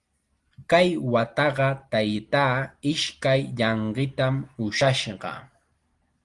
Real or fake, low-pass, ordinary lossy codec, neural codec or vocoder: real; 10.8 kHz; Opus, 24 kbps; none